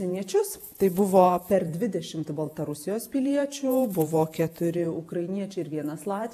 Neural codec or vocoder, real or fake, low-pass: vocoder, 48 kHz, 128 mel bands, Vocos; fake; 14.4 kHz